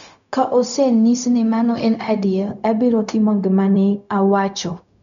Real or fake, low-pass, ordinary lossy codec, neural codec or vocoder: fake; 7.2 kHz; none; codec, 16 kHz, 0.4 kbps, LongCat-Audio-Codec